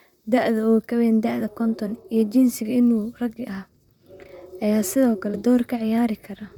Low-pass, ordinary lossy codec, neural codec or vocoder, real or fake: 19.8 kHz; none; vocoder, 44.1 kHz, 128 mel bands, Pupu-Vocoder; fake